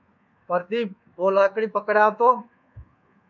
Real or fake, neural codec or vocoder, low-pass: fake; codec, 24 kHz, 1.2 kbps, DualCodec; 7.2 kHz